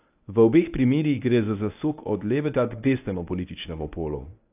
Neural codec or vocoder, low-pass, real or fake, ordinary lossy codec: codec, 24 kHz, 0.9 kbps, WavTokenizer, medium speech release version 1; 3.6 kHz; fake; AAC, 32 kbps